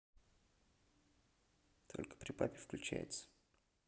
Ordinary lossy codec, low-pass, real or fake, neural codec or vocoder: none; none; real; none